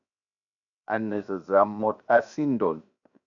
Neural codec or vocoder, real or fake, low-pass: codec, 16 kHz, 0.7 kbps, FocalCodec; fake; 7.2 kHz